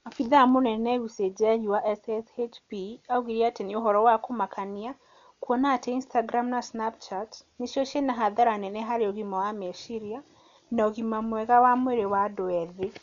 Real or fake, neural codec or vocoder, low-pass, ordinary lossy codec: real; none; 7.2 kHz; MP3, 64 kbps